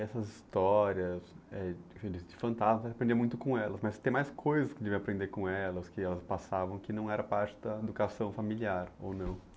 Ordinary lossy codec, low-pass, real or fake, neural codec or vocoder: none; none; real; none